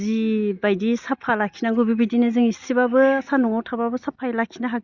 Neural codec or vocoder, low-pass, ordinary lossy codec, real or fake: none; 7.2 kHz; Opus, 64 kbps; real